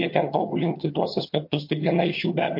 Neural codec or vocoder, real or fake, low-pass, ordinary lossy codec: vocoder, 22.05 kHz, 80 mel bands, HiFi-GAN; fake; 5.4 kHz; MP3, 32 kbps